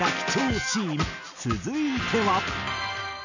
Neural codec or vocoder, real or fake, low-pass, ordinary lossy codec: none; real; 7.2 kHz; none